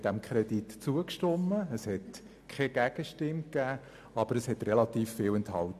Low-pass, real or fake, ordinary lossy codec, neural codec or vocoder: 14.4 kHz; real; AAC, 96 kbps; none